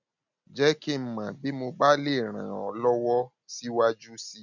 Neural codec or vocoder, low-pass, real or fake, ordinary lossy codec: none; 7.2 kHz; real; none